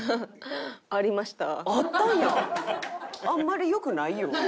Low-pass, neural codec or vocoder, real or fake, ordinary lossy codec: none; none; real; none